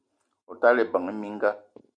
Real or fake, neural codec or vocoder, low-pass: real; none; 9.9 kHz